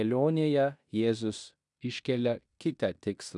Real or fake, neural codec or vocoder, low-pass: fake; codec, 16 kHz in and 24 kHz out, 0.9 kbps, LongCat-Audio-Codec, fine tuned four codebook decoder; 10.8 kHz